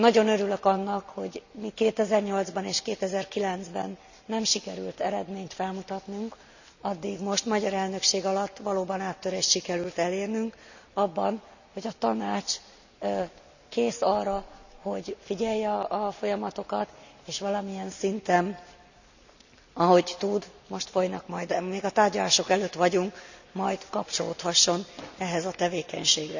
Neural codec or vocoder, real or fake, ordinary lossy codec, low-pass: none; real; none; 7.2 kHz